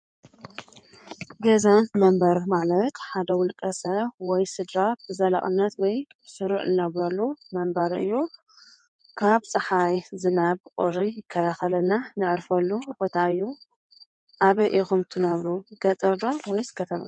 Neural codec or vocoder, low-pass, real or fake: codec, 16 kHz in and 24 kHz out, 2.2 kbps, FireRedTTS-2 codec; 9.9 kHz; fake